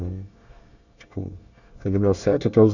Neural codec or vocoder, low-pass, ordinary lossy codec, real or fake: codec, 24 kHz, 1 kbps, SNAC; 7.2 kHz; none; fake